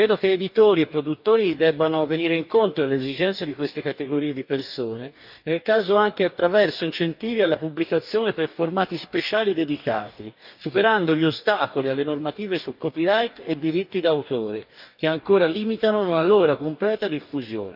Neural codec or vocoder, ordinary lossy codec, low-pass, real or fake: codec, 44.1 kHz, 2.6 kbps, DAC; none; 5.4 kHz; fake